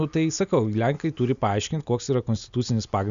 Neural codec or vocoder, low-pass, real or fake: none; 7.2 kHz; real